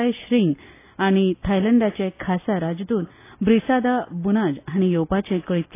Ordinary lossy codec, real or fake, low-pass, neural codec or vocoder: AAC, 24 kbps; real; 3.6 kHz; none